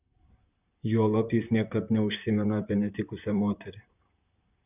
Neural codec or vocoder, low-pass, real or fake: vocoder, 44.1 kHz, 128 mel bands, Pupu-Vocoder; 3.6 kHz; fake